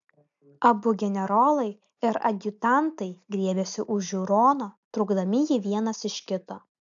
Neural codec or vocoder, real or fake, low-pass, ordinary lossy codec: none; real; 7.2 kHz; AAC, 64 kbps